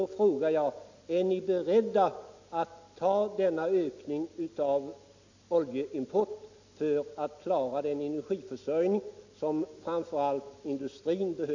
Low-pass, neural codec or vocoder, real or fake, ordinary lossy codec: 7.2 kHz; none; real; AAC, 48 kbps